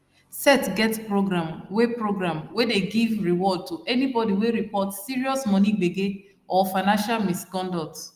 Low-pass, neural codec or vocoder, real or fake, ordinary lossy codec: 14.4 kHz; none; real; Opus, 32 kbps